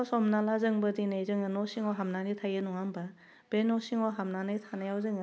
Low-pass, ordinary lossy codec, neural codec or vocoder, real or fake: none; none; none; real